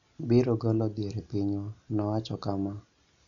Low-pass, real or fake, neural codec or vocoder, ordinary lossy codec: 7.2 kHz; real; none; none